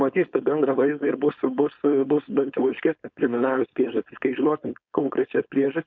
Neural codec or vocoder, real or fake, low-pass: codec, 16 kHz, 4.8 kbps, FACodec; fake; 7.2 kHz